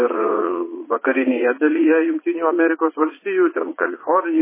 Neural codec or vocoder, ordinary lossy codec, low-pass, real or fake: vocoder, 22.05 kHz, 80 mel bands, Vocos; MP3, 16 kbps; 3.6 kHz; fake